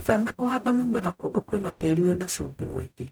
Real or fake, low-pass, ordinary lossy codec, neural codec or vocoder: fake; none; none; codec, 44.1 kHz, 0.9 kbps, DAC